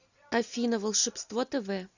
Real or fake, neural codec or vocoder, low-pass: real; none; 7.2 kHz